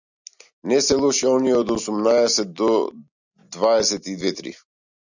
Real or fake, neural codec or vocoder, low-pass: real; none; 7.2 kHz